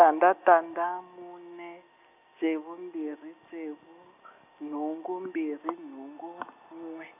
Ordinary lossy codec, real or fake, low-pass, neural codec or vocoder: none; real; 3.6 kHz; none